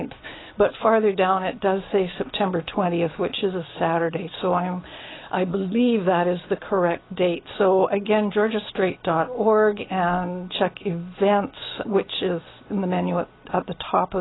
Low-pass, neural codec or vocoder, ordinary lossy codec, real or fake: 7.2 kHz; none; AAC, 16 kbps; real